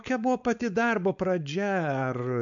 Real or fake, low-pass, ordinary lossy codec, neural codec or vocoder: fake; 7.2 kHz; MP3, 64 kbps; codec, 16 kHz, 4.8 kbps, FACodec